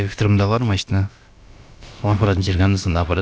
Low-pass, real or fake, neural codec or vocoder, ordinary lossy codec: none; fake; codec, 16 kHz, about 1 kbps, DyCAST, with the encoder's durations; none